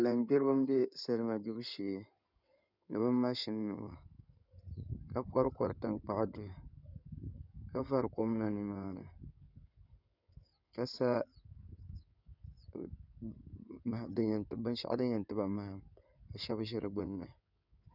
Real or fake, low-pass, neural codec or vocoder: fake; 5.4 kHz; codec, 16 kHz in and 24 kHz out, 2.2 kbps, FireRedTTS-2 codec